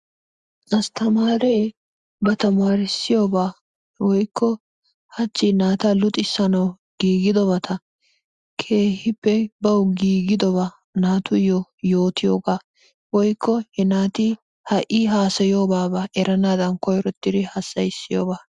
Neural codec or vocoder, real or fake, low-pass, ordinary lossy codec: none; real; 10.8 kHz; Opus, 64 kbps